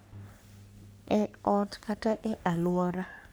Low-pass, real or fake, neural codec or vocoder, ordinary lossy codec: none; fake; codec, 44.1 kHz, 3.4 kbps, Pupu-Codec; none